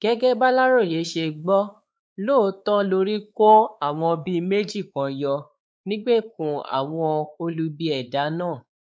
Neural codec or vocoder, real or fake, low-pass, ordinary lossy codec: codec, 16 kHz, 4 kbps, X-Codec, WavLM features, trained on Multilingual LibriSpeech; fake; none; none